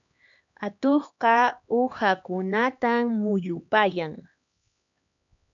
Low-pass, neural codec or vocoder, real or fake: 7.2 kHz; codec, 16 kHz, 2 kbps, X-Codec, HuBERT features, trained on LibriSpeech; fake